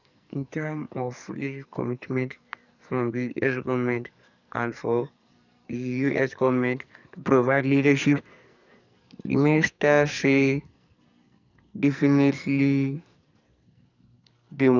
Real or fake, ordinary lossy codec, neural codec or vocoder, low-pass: fake; none; codec, 44.1 kHz, 2.6 kbps, SNAC; 7.2 kHz